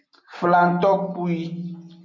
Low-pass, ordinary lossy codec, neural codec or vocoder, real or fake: 7.2 kHz; MP3, 64 kbps; none; real